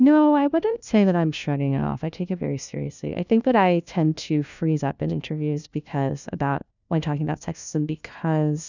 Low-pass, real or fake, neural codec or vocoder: 7.2 kHz; fake; codec, 16 kHz, 1 kbps, FunCodec, trained on LibriTTS, 50 frames a second